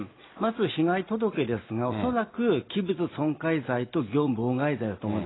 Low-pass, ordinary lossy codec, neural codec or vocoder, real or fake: 7.2 kHz; AAC, 16 kbps; none; real